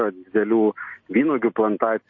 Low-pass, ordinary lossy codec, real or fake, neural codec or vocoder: 7.2 kHz; MP3, 32 kbps; real; none